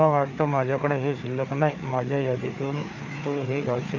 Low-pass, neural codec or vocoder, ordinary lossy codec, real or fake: 7.2 kHz; codec, 16 kHz, 4 kbps, FreqCodec, larger model; none; fake